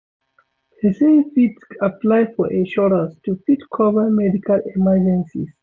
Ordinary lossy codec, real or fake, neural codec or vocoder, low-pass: none; real; none; none